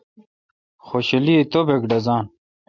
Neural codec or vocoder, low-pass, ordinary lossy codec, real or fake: none; 7.2 kHz; MP3, 64 kbps; real